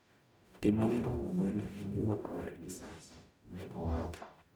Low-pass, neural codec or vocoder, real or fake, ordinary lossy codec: none; codec, 44.1 kHz, 0.9 kbps, DAC; fake; none